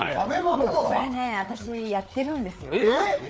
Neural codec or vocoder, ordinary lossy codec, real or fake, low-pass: codec, 16 kHz, 4 kbps, FreqCodec, larger model; none; fake; none